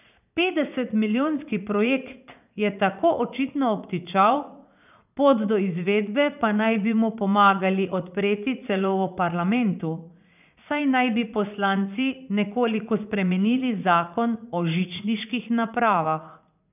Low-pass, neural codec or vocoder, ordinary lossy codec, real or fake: 3.6 kHz; vocoder, 44.1 kHz, 80 mel bands, Vocos; none; fake